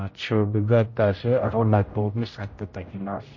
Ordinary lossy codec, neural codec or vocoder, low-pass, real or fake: MP3, 32 kbps; codec, 16 kHz, 0.5 kbps, X-Codec, HuBERT features, trained on general audio; 7.2 kHz; fake